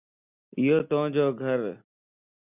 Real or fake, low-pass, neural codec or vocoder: real; 3.6 kHz; none